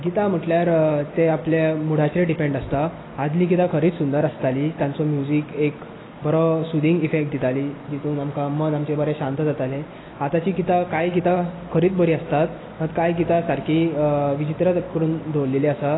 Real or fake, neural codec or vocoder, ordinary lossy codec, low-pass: real; none; AAC, 16 kbps; 7.2 kHz